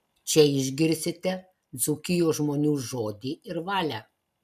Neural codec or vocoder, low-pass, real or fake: none; 14.4 kHz; real